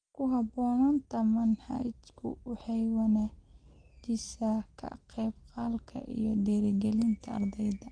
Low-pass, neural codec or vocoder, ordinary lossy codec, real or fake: 9.9 kHz; none; Opus, 24 kbps; real